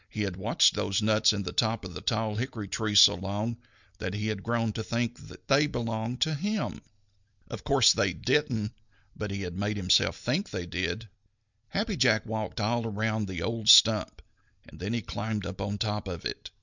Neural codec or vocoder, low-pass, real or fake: none; 7.2 kHz; real